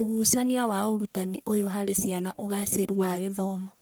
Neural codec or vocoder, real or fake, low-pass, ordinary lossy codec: codec, 44.1 kHz, 1.7 kbps, Pupu-Codec; fake; none; none